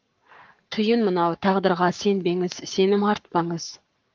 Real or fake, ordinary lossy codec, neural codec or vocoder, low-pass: fake; Opus, 32 kbps; codec, 44.1 kHz, 7.8 kbps, Pupu-Codec; 7.2 kHz